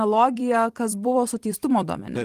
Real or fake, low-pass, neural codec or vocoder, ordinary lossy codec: fake; 14.4 kHz; vocoder, 44.1 kHz, 128 mel bands every 256 samples, BigVGAN v2; Opus, 24 kbps